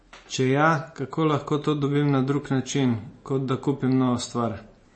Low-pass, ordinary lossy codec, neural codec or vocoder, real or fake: 9.9 kHz; MP3, 32 kbps; none; real